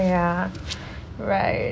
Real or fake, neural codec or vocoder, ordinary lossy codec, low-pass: real; none; none; none